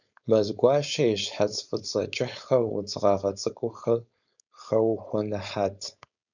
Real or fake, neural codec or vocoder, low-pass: fake; codec, 16 kHz, 4.8 kbps, FACodec; 7.2 kHz